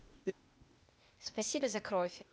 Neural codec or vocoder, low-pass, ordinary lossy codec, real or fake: codec, 16 kHz, 0.8 kbps, ZipCodec; none; none; fake